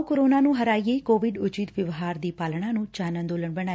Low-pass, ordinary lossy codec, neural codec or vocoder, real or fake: none; none; none; real